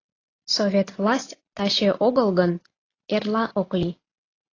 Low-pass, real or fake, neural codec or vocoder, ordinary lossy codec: 7.2 kHz; real; none; AAC, 32 kbps